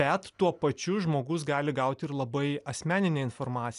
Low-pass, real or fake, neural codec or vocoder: 10.8 kHz; real; none